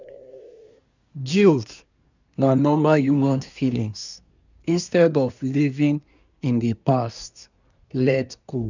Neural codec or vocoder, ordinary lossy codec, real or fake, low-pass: codec, 24 kHz, 1 kbps, SNAC; none; fake; 7.2 kHz